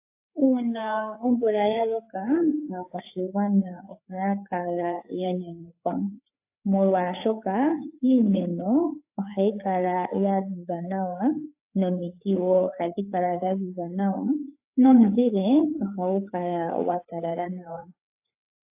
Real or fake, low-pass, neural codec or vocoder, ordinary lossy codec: fake; 3.6 kHz; codec, 16 kHz, 4 kbps, FreqCodec, larger model; AAC, 32 kbps